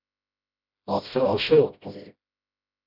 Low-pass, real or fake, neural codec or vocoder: 5.4 kHz; fake; codec, 16 kHz, 0.5 kbps, FreqCodec, smaller model